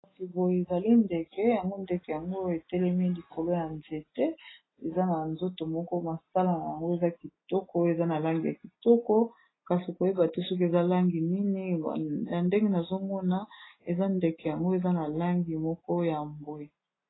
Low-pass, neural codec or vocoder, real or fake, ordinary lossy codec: 7.2 kHz; none; real; AAC, 16 kbps